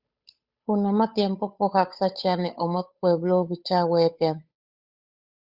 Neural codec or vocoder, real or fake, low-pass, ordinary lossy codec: codec, 16 kHz, 8 kbps, FunCodec, trained on Chinese and English, 25 frames a second; fake; 5.4 kHz; Opus, 64 kbps